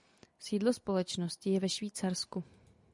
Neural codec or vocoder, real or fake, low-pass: none; real; 10.8 kHz